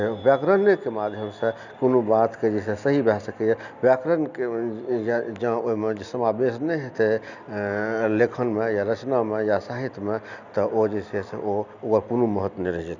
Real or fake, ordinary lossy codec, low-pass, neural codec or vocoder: real; none; 7.2 kHz; none